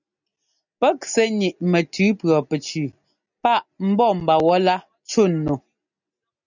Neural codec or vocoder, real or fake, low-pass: none; real; 7.2 kHz